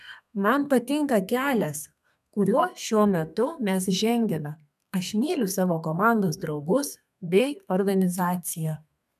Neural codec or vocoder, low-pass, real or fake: codec, 32 kHz, 1.9 kbps, SNAC; 14.4 kHz; fake